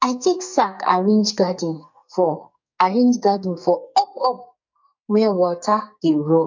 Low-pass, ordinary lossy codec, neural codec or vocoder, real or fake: 7.2 kHz; MP3, 48 kbps; codec, 44.1 kHz, 2.6 kbps, SNAC; fake